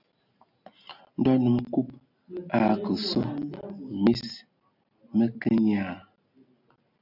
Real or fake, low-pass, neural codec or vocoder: real; 5.4 kHz; none